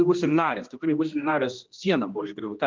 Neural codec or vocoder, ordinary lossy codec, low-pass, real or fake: codec, 16 kHz, 1 kbps, X-Codec, HuBERT features, trained on general audio; Opus, 32 kbps; 7.2 kHz; fake